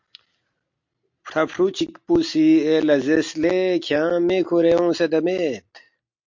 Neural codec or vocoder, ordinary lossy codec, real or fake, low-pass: none; MP3, 48 kbps; real; 7.2 kHz